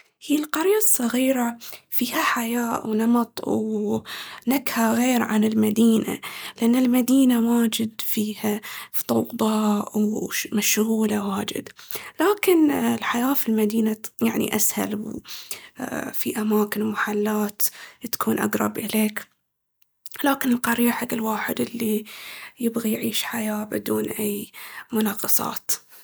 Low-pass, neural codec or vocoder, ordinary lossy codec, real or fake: none; none; none; real